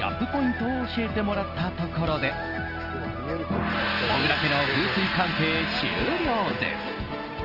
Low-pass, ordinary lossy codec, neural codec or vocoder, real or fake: 5.4 kHz; Opus, 24 kbps; none; real